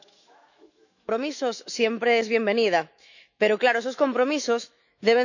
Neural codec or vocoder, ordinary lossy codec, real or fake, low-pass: autoencoder, 48 kHz, 128 numbers a frame, DAC-VAE, trained on Japanese speech; none; fake; 7.2 kHz